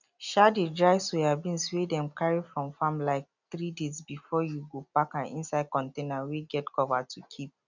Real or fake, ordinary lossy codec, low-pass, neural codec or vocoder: real; none; 7.2 kHz; none